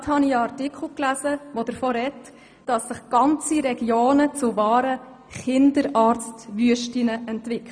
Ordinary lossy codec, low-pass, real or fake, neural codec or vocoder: none; 9.9 kHz; real; none